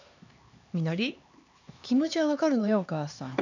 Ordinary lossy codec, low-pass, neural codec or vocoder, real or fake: none; 7.2 kHz; codec, 16 kHz, 2 kbps, X-Codec, HuBERT features, trained on LibriSpeech; fake